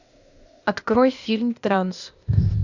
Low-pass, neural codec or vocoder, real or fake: 7.2 kHz; codec, 16 kHz, 0.8 kbps, ZipCodec; fake